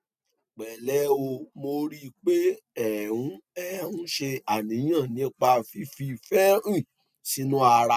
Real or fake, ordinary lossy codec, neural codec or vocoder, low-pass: real; MP3, 96 kbps; none; 14.4 kHz